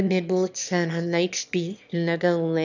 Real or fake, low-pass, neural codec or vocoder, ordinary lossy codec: fake; 7.2 kHz; autoencoder, 22.05 kHz, a latent of 192 numbers a frame, VITS, trained on one speaker; none